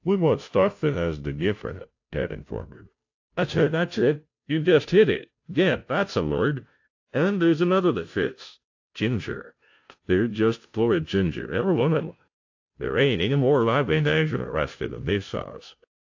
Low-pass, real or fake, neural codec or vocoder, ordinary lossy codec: 7.2 kHz; fake; codec, 16 kHz, 0.5 kbps, FunCodec, trained on Chinese and English, 25 frames a second; AAC, 48 kbps